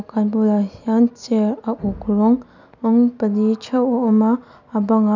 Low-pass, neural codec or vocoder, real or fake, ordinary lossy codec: 7.2 kHz; none; real; none